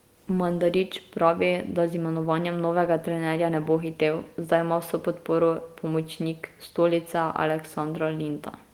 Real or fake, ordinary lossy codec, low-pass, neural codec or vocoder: real; Opus, 24 kbps; 19.8 kHz; none